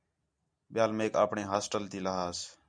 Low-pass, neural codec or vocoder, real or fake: 9.9 kHz; none; real